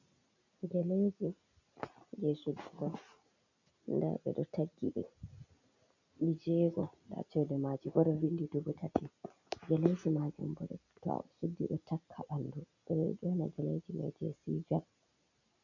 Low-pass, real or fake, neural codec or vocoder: 7.2 kHz; real; none